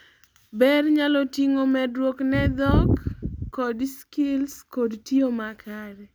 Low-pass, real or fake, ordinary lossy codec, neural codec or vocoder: none; real; none; none